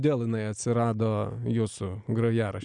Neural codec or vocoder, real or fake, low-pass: none; real; 9.9 kHz